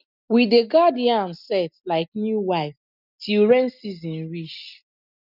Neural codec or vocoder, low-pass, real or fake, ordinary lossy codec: none; 5.4 kHz; real; none